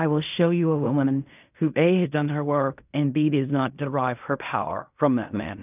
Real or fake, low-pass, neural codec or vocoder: fake; 3.6 kHz; codec, 16 kHz in and 24 kHz out, 0.4 kbps, LongCat-Audio-Codec, fine tuned four codebook decoder